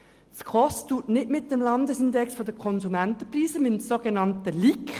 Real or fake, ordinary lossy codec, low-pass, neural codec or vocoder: real; Opus, 24 kbps; 14.4 kHz; none